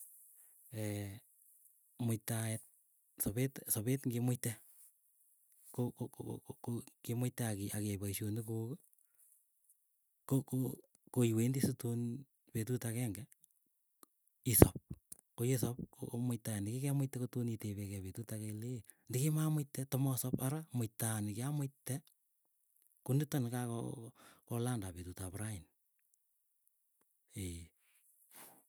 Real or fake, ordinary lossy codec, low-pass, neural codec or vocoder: real; none; none; none